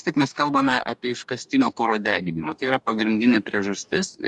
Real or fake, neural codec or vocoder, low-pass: fake; codec, 44.1 kHz, 2.6 kbps, DAC; 10.8 kHz